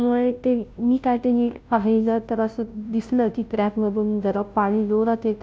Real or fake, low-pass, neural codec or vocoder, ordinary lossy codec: fake; none; codec, 16 kHz, 0.5 kbps, FunCodec, trained on Chinese and English, 25 frames a second; none